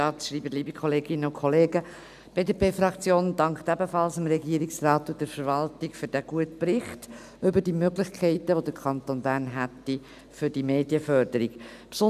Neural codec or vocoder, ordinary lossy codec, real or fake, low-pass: none; none; real; 14.4 kHz